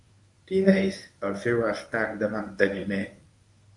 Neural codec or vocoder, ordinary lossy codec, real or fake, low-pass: codec, 24 kHz, 0.9 kbps, WavTokenizer, medium speech release version 1; AAC, 64 kbps; fake; 10.8 kHz